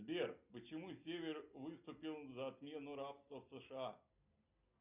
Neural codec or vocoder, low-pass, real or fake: none; 3.6 kHz; real